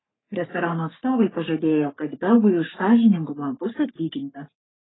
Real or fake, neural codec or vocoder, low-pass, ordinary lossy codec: fake; codec, 44.1 kHz, 3.4 kbps, Pupu-Codec; 7.2 kHz; AAC, 16 kbps